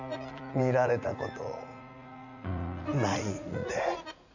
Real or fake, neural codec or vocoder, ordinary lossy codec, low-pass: fake; vocoder, 44.1 kHz, 80 mel bands, Vocos; none; 7.2 kHz